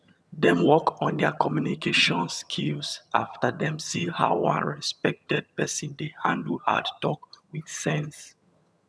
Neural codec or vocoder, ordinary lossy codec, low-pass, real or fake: vocoder, 22.05 kHz, 80 mel bands, HiFi-GAN; none; none; fake